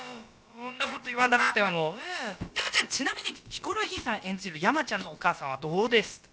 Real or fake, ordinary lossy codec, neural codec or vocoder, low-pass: fake; none; codec, 16 kHz, about 1 kbps, DyCAST, with the encoder's durations; none